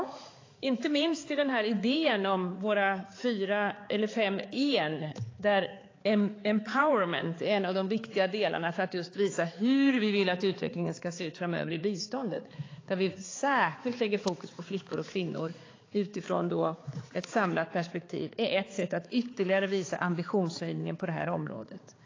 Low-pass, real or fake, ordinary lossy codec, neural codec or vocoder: 7.2 kHz; fake; AAC, 32 kbps; codec, 16 kHz, 4 kbps, X-Codec, HuBERT features, trained on balanced general audio